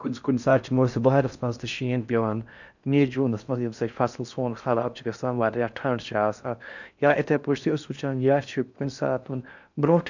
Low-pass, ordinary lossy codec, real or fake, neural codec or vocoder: 7.2 kHz; none; fake; codec, 16 kHz in and 24 kHz out, 0.6 kbps, FocalCodec, streaming, 4096 codes